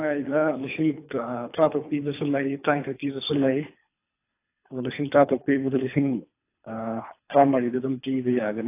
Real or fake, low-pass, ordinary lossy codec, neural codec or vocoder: fake; 3.6 kHz; AAC, 24 kbps; codec, 24 kHz, 3 kbps, HILCodec